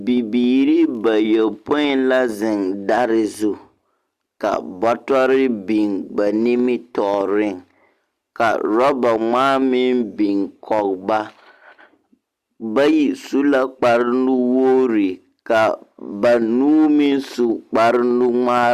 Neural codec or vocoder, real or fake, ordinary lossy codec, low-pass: none; real; Opus, 64 kbps; 14.4 kHz